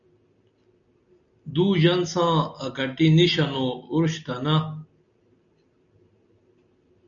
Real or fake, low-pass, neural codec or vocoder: real; 7.2 kHz; none